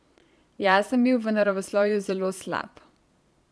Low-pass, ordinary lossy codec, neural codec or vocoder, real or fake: none; none; vocoder, 22.05 kHz, 80 mel bands, Vocos; fake